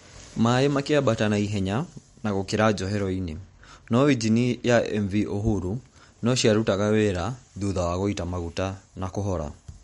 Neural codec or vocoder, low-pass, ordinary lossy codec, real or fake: none; 19.8 kHz; MP3, 48 kbps; real